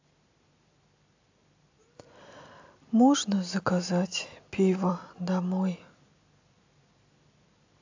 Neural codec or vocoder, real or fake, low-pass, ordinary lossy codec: none; real; 7.2 kHz; AAC, 48 kbps